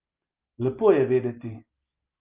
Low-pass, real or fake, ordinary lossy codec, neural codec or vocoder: 3.6 kHz; real; Opus, 32 kbps; none